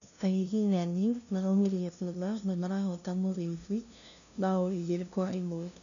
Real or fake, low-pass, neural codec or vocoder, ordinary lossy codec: fake; 7.2 kHz; codec, 16 kHz, 0.5 kbps, FunCodec, trained on LibriTTS, 25 frames a second; none